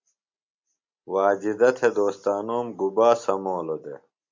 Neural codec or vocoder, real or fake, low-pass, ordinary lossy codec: none; real; 7.2 kHz; MP3, 64 kbps